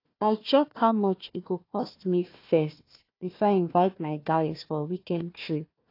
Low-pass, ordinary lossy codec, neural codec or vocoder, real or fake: 5.4 kHz; AAC, 32 kbps; codec, 16 kHz, 1 kbps, FunCodec, trained on Chinese and English, 50 frames a second; fake